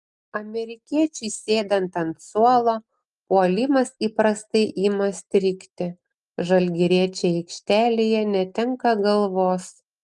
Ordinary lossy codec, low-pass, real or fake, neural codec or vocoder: Opus, 32 kbps; 10.8 kHz; real; none